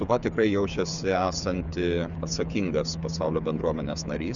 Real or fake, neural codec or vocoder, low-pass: fake; codec, 16 kHz, 8 kbps, FreqCodec, smaller model; 7.2 kHz